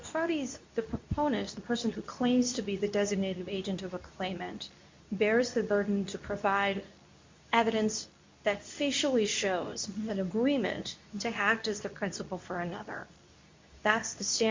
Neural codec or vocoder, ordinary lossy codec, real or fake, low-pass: codec, 24 kHz, 0.9 kbps, WavTokenizer, medium speech release version 2; MP3, 64 kbps; fake; 7.2 kHz